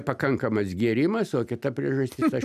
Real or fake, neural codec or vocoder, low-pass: real; none; 14.4 kHz